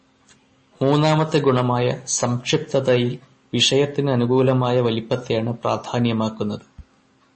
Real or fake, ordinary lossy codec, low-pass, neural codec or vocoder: real; MP3, 32 kbps; 10.8 kHz; none